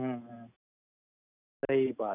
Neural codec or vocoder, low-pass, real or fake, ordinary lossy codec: none; 3.6 kHz; real; none